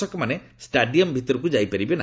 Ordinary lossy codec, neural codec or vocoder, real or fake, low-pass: none; none; real; none